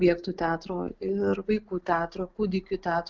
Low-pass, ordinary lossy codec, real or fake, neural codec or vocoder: 7.2 kHz; Opus, 24 kbps; real; none